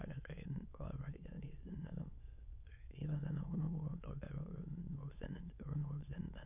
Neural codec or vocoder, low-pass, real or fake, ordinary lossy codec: autoencoder, 22.05 kHz, a latent of 192 numbers a frame, VITS, trained on many speakers; 3.6 kHz; fake; none